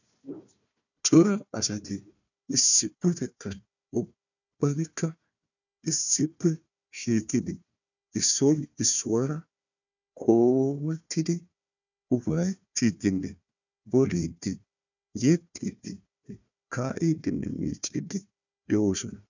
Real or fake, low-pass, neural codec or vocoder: fake; 7.2 kHz; codec, 16 kHz, 1 kbps, FunCodec, trained on Chinese and English, 50 frames a second